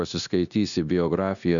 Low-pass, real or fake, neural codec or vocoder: 7.2 kHz; fake; codec, 16 kHz, 0.9 kbps, LongCat-Audio-Codec